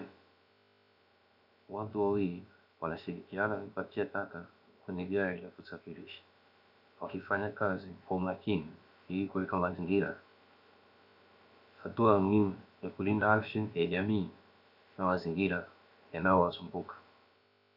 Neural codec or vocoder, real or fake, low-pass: codec, 16 kHz, about 1 kbps, DyCAST, with the encoder's durations; fake; 5.4 kHz